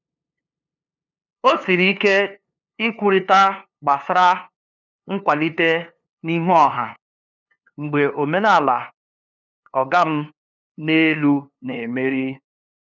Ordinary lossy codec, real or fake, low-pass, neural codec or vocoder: none; fake; 7.2 kHz; codec, 16 kHz, 2 kbps, FunCodec, trained on LibriTTS, 25 frames a second